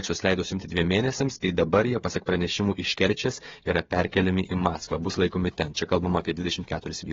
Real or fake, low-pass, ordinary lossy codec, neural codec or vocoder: fake; 7.2 kHz; AAC, 24 kbps; codec, 16 kHz, 8 kbps, FreqCodec, smaller model